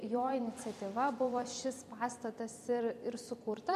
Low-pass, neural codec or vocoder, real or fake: 14.4 kHz; vocoder, 44.1 kHz, 128 mel bands every 256 samples, BigVGAN v2; fake